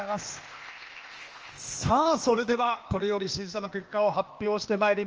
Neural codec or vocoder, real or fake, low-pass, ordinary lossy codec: codec, 16 kHz, 0.8 kbps, ZipCodec; fake; 7.2 kHz; Opus, 24 kbps